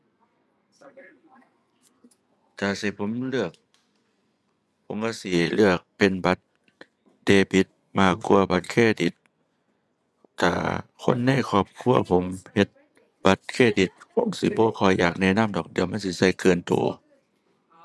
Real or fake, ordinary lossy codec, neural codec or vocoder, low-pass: fake; none; vocoder, 24 kHz, 100 mel bands, Vocos; none